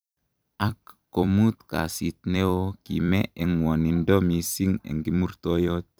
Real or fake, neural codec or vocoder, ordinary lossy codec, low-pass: fake; vocoder, 44.1 kHz, 128 mel bands every 256 samples, BigVGAN v2; none; none